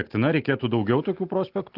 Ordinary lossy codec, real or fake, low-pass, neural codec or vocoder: Opus, 24 kbps; real; 5.4 kHz; none